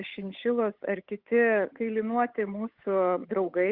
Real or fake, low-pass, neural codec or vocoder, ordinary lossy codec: fake; 5.4 kHz; codec, 16 kHz, 8 kbps, FunCodec, trained on Chinese and English, 25 frames a second; Opus, 64 kbps